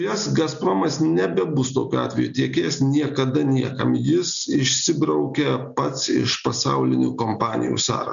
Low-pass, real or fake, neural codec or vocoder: 7.2 kHz; real; none